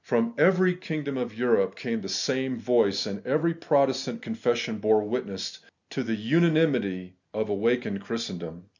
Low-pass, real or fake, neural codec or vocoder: 7.2 kHz; real; none